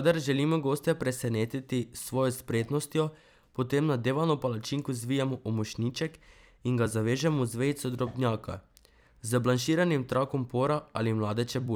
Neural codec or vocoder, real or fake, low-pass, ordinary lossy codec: vocoder, 44.1 kHz, 128 mel bands every 256 samples, BigVGAN v2; fake; none; none